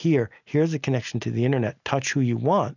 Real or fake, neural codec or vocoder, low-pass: real; none; 7.2 kHz